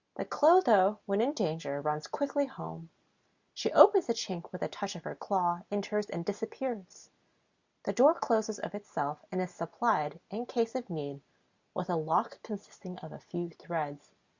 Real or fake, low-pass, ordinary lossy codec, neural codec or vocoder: real; 7.2 kHz; Opus, 64 kbps; none